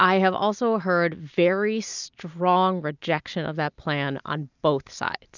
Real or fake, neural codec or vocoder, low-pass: real; none; 7.2 kHz